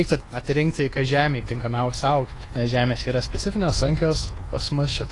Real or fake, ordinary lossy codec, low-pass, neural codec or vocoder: fake; AAC, 32 kbps; 10.8 kHz; codec, 24 kHz, 1.2 kbps, DualCodec